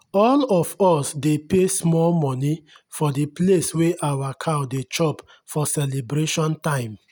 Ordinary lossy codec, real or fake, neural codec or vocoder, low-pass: none; real; none; none